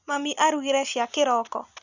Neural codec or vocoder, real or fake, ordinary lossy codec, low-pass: none; real; none; 7.2 kHz